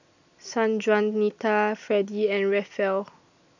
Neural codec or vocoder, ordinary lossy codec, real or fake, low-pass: none; none; real; 7.2 kHz